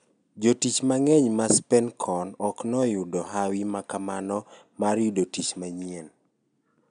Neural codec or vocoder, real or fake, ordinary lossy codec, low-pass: none; real; none; 9.9 kHz